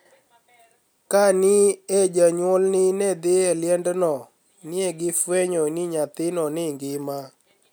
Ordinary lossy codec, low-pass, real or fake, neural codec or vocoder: none; none; real; none